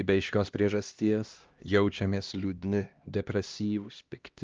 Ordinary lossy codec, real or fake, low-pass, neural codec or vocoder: Opus, 24 kbps; fake; 7.2 kHz; codec, 16 kHz, 1 kbps, X-Codec, HuBERT features, trained on LibriSpeech